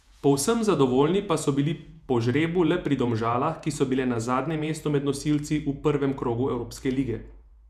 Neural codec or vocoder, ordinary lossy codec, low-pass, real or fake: vocoder, 48 kHz, 128 mel bands, Vocos; none; 14.4 kHz; fake